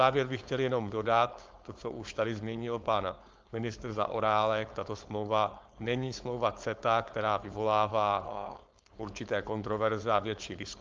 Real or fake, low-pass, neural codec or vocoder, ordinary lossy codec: fake; 7.2 kHz; codec, 16 kHz, 4.8 kbps, FACodec; Opus, 24 kbps